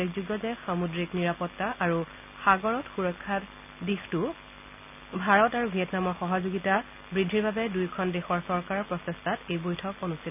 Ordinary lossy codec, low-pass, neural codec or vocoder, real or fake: none; 3.6 kHz; none; real